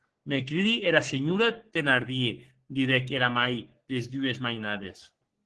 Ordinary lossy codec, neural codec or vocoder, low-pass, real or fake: Opus, 16 kbps; codec, 44.1 kHz, 3.4 kbps, Pupu-Codec; 10.8 kHz; fake